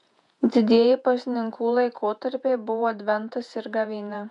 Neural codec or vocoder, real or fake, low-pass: vocoder, 48 kHz, 128 mel bands, Vocos; fake; 10.8 kHz